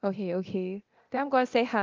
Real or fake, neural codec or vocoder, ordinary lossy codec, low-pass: fake; codec, 24 kHz, 0.9 kbps, DualCodec; Opus, 32 kbps; 7.2 kHz